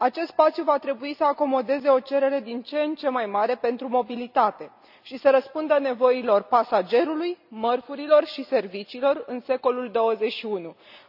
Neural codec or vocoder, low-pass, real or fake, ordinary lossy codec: none; 5.4 kHz; real; none